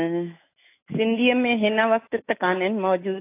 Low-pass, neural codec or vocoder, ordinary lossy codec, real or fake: 3.6 kHz; autoencoder, 48 kHz, 128 numbers a frame, DAC-VAE, trained on Japanese speech; AAC, 24 kbps; fake